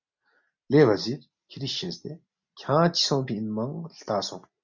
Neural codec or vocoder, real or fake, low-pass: none; real; 7.2 kHz